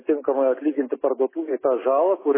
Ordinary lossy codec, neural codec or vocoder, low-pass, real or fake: MP3, 16 kbps; none; 3.6 kHz; real